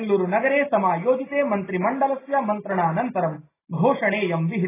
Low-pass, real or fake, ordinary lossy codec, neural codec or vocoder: 3.6 kHz; real; AAC, 16 kbps; none